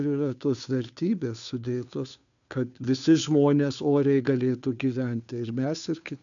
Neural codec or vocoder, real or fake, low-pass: codec, 16 kHz, 2 kbps, FunCodec, trained on Chinese and English, 25 frames a second; fake; 7.2 kHz